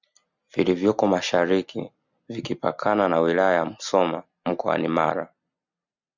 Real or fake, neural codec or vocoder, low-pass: real; none; 7.2 kHz